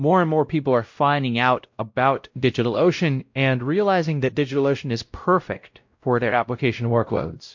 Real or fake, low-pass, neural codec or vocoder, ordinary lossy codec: fake; 7.2 kHz; codec, 16 kHz, 0.5 kbps, X-Codec, WavLM features, trained on Multilingual LibriSpeech; MP3, 48 kbps